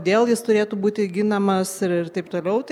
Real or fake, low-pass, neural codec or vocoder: real; 19.8 kHz; none